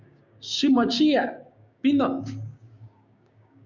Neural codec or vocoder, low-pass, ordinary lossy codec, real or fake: codec, 16 kHz, 6 kbps, DAC; 7.2 kHz; Opus, 64 kbps; fake